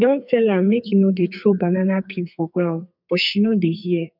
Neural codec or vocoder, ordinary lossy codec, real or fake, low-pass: codec, 44.1 kHz, 2.6 kbps, SNAC; none; fake; 5.4 kHz